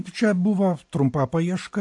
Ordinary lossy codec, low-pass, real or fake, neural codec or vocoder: AAC, 64 kbps; 10.8 kHz; real; none